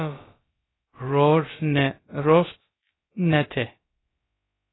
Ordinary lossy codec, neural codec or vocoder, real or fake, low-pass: AAC, 16 kbps; codec, 16 kHz, about 1 kbps, DyCAST, with the encoder's durations; fake; 7.2 kHz